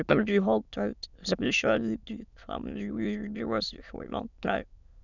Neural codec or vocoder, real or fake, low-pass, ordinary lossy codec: autoencoder, 22.05 kHz, a latent of 192 numbers a frame, VITS, trained on many speakers; fake; 7.2 kHz; none